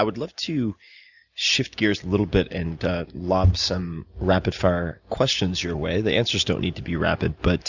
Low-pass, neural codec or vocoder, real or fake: 7.2 kHz; none; real